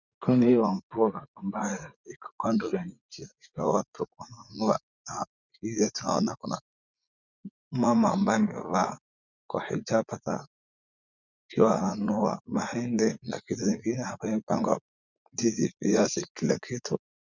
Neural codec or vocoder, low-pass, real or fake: vocoder, 44.1 kHz, 80 mel bands, Vocos; 7.2 kHz; fake